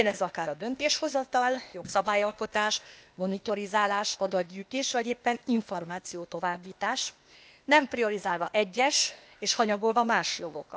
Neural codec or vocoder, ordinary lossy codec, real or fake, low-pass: codec, 16 kHz, 0.8 kbps, ZipCodec; none; fake; none